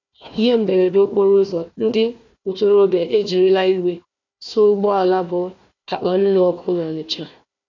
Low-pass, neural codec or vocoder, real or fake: 7.2 kHz; codec, 16 kHz, 1 kbps, FunCodec, trained on Chinese and English, 50 frames a second; fake